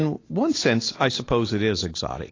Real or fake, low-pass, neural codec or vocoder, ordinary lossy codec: real; 7.2 kHz; none; AAC, 32 kbps